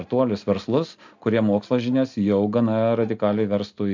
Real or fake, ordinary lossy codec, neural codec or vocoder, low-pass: real; MP3, 64 kbps; none; 7.2 kHz